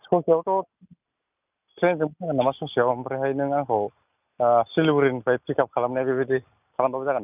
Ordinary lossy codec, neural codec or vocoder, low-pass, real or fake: none; none; 3.6 kHz; real